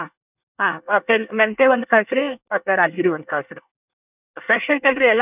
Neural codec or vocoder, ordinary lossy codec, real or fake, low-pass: codec, 24 kHz, 1 kbps, SNAC; none; fake; 3.6 kHz